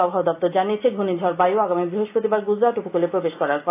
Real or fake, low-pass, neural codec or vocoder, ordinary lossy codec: real; 3.6 kHz; none; AAC, 24 kbps